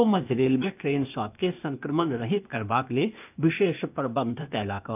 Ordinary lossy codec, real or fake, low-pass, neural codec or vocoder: none; fake; 3.6 kHz; codec, 16 kHz, 0.8 kbps, ZipCodec